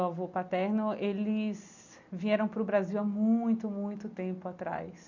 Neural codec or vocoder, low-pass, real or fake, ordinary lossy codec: none; 7.2 kHz; real; none